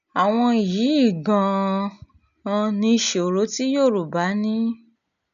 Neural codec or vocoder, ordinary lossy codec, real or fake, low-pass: none; none; real; 7.2 kHz